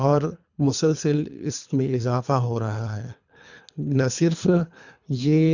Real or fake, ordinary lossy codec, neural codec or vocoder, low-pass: fake; none; codec, 24 kHz, 3 kbps, HILCodec; 7.2 kHz